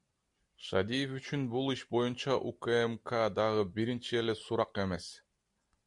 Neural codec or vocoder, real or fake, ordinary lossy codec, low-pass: none; real; MP3, 64 kbps; 10.8 kHz